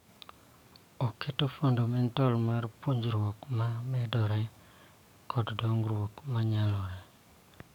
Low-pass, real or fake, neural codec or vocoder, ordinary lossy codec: 19.8 kHz; fake; codec, 44.1 kHz, 7.8 kbps, DAC; none